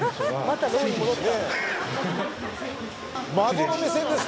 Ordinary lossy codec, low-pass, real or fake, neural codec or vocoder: none; none; real; none